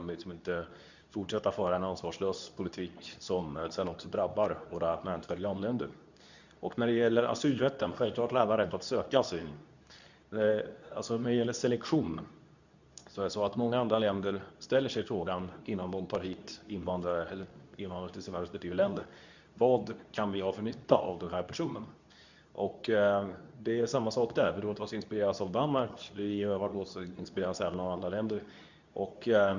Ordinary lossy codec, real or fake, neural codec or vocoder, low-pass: none; fake; codec, 24 kHz, 0.9 kbps, WavTokenizer, medium speech release version 2; 7.2 kHz